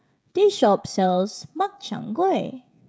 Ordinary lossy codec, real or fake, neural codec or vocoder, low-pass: none; fake; codec, 16 kHz, 16 kbps, FreqCodec, smaller model; none